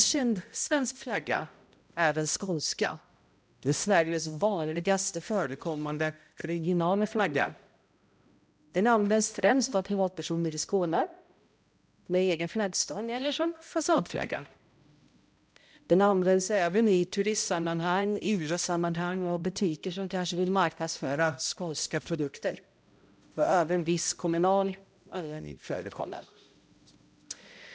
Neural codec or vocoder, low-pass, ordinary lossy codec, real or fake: codec, 16 kHz, 0.5 kbps, X-Codec, HuBERT features, trained on balanced general audio; none; none; fake